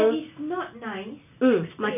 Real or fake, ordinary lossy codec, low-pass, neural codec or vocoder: real; none; 3.6 kHz; none